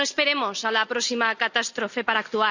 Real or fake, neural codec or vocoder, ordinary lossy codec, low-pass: real; none; none; 7.2 kHz